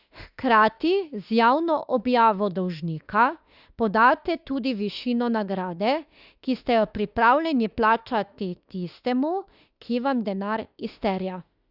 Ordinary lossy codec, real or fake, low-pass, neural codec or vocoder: Opus, 64 kbps; fake; 5.4 kHz; autoencoder, 48 kHz, 32 numbers a frame, DAC-VAE, trained on Japanese speech